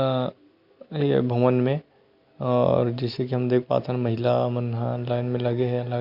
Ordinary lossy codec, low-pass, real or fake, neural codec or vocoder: Opus, 64 kbps; 5.4 kHz; real; none